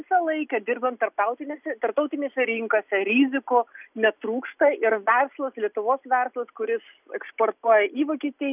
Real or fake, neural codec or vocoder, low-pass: real; none; 3.6 kHz